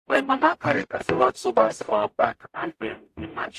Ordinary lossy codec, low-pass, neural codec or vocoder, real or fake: AAC, 64 kbps; 14.4 kHz; codec, 44.1 kHz, 0.9 kbps, DAC; fake